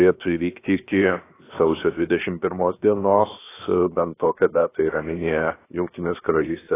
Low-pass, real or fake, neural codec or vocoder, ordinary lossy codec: 3.6 kHz; fake; codec, 16 kHz, 0.7 kbps, FocalCodec; AAC, 16 kbps